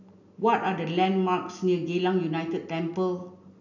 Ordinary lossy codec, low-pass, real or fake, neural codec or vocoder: none; 7.2 kHz; real; none